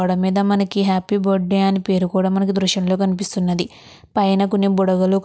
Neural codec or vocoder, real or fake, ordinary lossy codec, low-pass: none; real; none; none